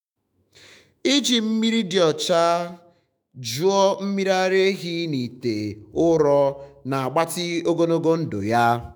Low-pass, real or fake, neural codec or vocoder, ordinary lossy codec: none; fake; autoencoder, 48 kHz, 128 numbers a frame, DAC-VAE, trained on Japanese speech; none